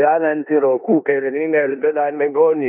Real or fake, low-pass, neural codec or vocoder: fake; 3.6 kHz; codec, 16 kHz in and 24 kHz out, 0.9 kbps, LongCat-Audio-Codec, four codebook decoder